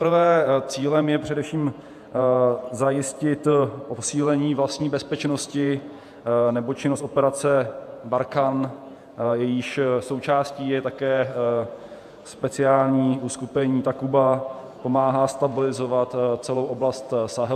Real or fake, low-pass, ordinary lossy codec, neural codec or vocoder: fake; 14.4 kHz; AAC, 96 kbps; vocoder, 48 kHz, 128 mel bands, Vocos